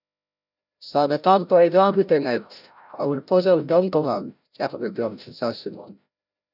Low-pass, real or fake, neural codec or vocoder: 5.4 kHz; fake; codec, 16 kHz, 0.5 kbps, FreqCodec, larger model